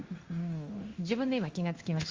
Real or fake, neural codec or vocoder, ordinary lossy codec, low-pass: fake; codec, 16 kHz in and 24 kHz out, 1 kbps, XY-Tokenizer; Opus, 32 kbps; 7.2 kHz